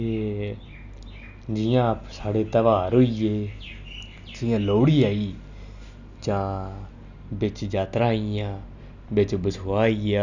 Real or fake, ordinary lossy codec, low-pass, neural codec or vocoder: real; none; 7.2 kHz; none